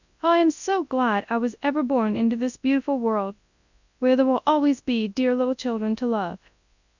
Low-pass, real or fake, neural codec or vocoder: 7.2 kHz; fake; codec, 24 kHz, 0.9 kbps, WavTokenizer, large speech release